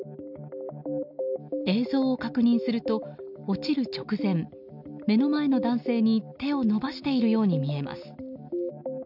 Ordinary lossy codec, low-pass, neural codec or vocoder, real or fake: none; 5.4 kHz; none; real